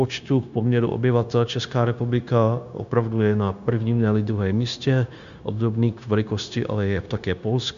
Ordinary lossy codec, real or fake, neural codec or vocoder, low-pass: Opus, 64 kbps; fake; codec, 16 kHz, 0.9 kbps, LongCat-Audio-Codec; 7.2 kHz